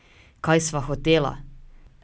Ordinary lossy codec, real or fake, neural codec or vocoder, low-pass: none; real; none; none